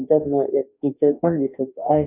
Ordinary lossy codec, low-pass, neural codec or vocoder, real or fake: none; 3.6 kHz; codec, 44.1 kHz, 2.6 kbps, DAC; fake